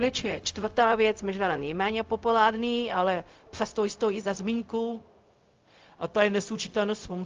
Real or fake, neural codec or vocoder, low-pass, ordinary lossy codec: fake; codec, 16 kHz, 0.4 kbps, LongCat-Audio-Codec; 7.2 kHz; Opus, 32 kbps